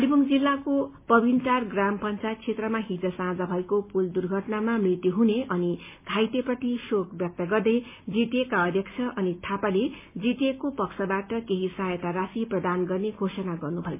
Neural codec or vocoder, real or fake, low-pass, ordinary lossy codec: none; real; 3.6 kHz; MP3, 24 kbps